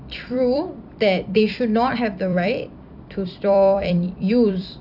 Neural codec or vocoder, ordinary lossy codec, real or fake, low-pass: vocoder, 44.1 kHz, 128 mel bands every 256 samples, BigVGAN v2; none; fake; 5.4 kHz